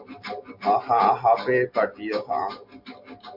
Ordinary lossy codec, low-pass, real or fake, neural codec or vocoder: MP3, 48 kbps; 5.4 kHz; real; none